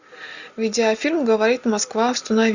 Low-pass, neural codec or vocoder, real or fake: 7.2 kHz; none; real